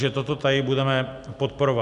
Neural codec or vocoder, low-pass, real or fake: none; 10.8 kHz; real